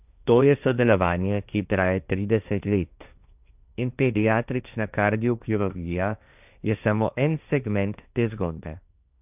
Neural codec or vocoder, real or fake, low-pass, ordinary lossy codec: codec, 16 kHz, 1.1 kbps, Voila-Tokenizer; fake; 3.6 kHz; none